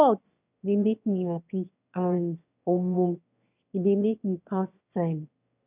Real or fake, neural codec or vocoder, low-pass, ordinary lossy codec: fake; autoencoder, 22.05 kHz, a latent of 192 numbers a frame, VITS, trained on one speaker; 3.6 kHz; none